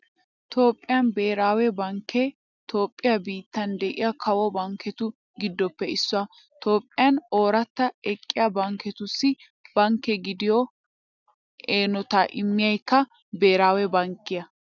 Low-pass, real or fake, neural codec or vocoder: 7.2 kHz; real; none